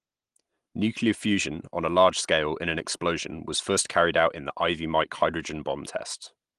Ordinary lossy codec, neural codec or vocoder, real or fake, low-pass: Opus, 24 kbps; none; real; 10.8 kHz